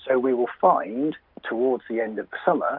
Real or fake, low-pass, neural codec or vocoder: real; 5.4 kHz; none